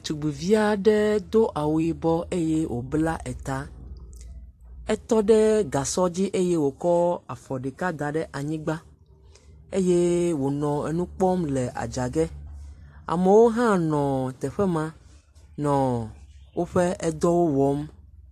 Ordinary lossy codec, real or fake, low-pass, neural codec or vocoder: AAC, 64 kbps; fake; 14.4 kHz; vocoder, 44.1 kHz, 128 mel bands every 256 samples, BigVGAN v2